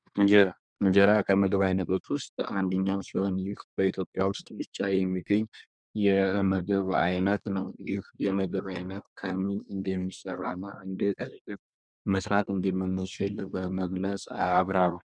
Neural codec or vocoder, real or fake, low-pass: codec, 24 kHz, 1 kbps, SNAC; fake; 9.9 kHz